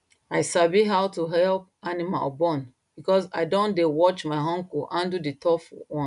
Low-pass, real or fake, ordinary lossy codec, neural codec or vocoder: 10.8 kHz; real; none; none